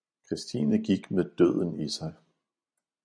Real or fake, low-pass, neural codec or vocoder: real; 9.9 kHz; none